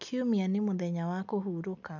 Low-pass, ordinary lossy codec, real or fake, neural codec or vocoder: 7.2 kHz; none; real; none